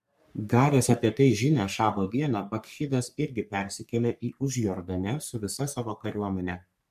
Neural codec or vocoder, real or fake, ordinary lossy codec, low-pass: codec, 44.1 kHz, 3.4 kbps, Pupu-Codec; fake; MP3, 96 kbps; 14.4 kHz